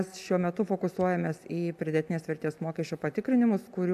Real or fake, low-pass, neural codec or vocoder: real; 14.4 kHz; none